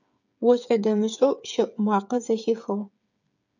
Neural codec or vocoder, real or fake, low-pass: codec, 16 kHz, 8 kbps, FreqCodec, smaller model; fake; 7.2 kHz